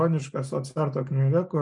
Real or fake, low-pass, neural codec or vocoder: real; 10.8 kHz; none